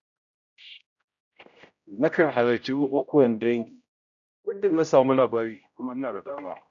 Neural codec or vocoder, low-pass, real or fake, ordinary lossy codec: codec, 16 kHz, 0.5 kbps, X-Codec, HuBERT features, trained on general audio; 7.2 kHz; fake; none